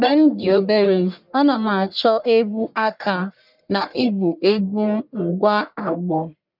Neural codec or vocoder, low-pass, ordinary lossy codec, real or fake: codec, 44.1 kHz, 1.7 kbps, Pupu-Codec; 5.4 kHz; none; fake